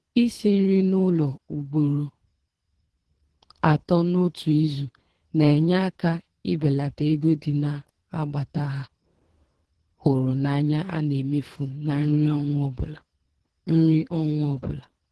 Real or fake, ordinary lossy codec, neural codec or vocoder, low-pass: fake; Opus, 16 kbps; codec, 24 kHz, 3 kbps, HILCodec; 10.8 kHz